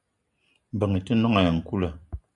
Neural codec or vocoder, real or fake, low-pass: none; real; 10.8 kHz